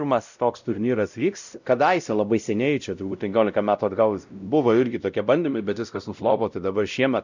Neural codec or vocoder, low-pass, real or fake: codec, 16 kHz, 0.5 kbps, X-Codec, WavLM features, trained on Multilingual LibriSpeech; 7.2 kHz; fake